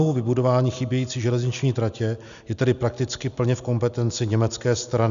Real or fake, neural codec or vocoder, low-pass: real; none; 7.2 kHz